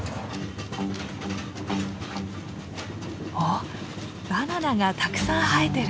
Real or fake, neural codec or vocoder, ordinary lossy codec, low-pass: real; none; none; none